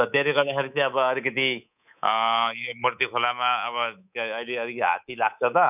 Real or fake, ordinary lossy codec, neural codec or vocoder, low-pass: fake; none; codec, 24 kHz, 3.1 kbps, DualCodec; 3.6 kHz